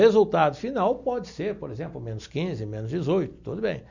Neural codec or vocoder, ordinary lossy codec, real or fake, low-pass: none; none; real; 7.2 kHz